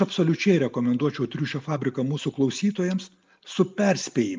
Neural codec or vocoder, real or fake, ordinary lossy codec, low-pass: none; real; Opus, 24 kbps; 7.2 kHz